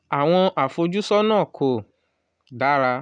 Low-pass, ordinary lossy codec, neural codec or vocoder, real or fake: 9.9 kHz; none; none; real